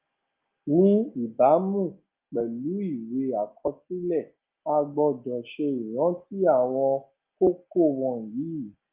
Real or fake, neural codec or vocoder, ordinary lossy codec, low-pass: real; none; Opus, 24 kbps; 3.6 kHz